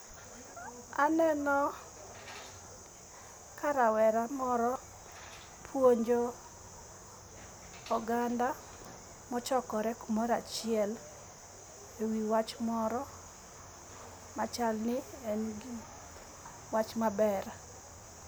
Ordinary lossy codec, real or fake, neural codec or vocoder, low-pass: none; real; none; none